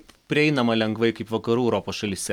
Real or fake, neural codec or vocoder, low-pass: real; none; 19.8 kHz